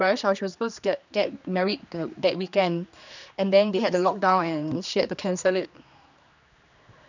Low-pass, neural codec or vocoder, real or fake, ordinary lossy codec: 7.2 kHz; codec, 16 kHz, 2 kbps, X-Codec, HuBERT features, trained on general audio; fake; none